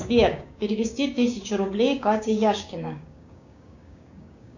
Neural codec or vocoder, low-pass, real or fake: codec, 16 kHz, 6 kbps, DAC; 7.2 kHz; fake